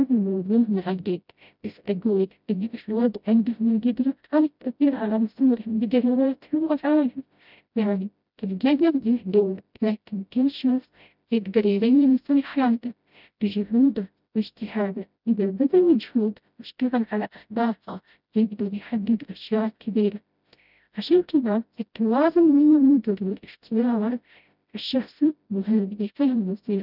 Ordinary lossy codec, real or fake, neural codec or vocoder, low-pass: none; fake; codec, 16 kHz, 0.5 kbps, FreqCodec, smaller model; 5.4 kHz